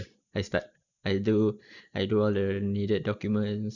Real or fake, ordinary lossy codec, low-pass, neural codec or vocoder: real; none; 7.2 kHz; none